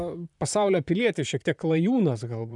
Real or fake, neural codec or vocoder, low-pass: fake; vocoder, 44.1 kHz, 128 mel bands, Pupu-Vocoder; 10.8 kHz